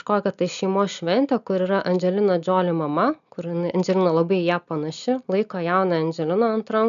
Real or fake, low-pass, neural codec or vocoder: real; 7.2 kHz; none